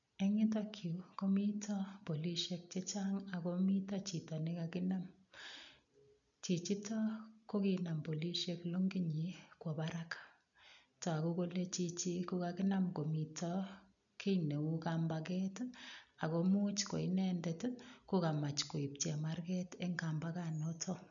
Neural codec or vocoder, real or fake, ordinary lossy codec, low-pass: none; real; none; 7.2 kHz